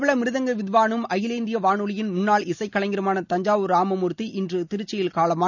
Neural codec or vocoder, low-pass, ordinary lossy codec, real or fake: none; 7.2 kHz; none; real